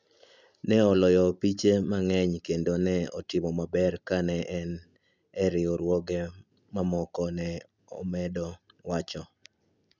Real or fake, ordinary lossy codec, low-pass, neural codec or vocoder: real; none; 7.2 kHz; none